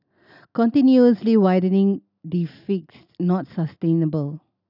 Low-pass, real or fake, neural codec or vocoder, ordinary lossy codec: 5.4 kHz; real; none; none